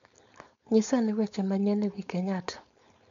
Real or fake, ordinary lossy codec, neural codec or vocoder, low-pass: fake; MP3, 64 kbps; codec, 16 kHz, 4.8 kbps, FACodec; 7.2 kHz